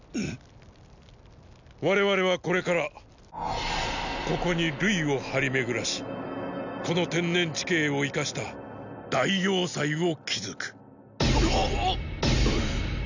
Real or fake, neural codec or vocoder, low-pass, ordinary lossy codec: real; none; 7.2 kHz; none